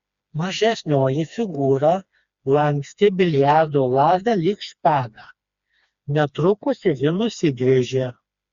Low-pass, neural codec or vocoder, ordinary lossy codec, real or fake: 7.2 kHz; codec, 16 kHz, 2 kbps, FreqCodec, smaller model; Opus, 64 kbps; fake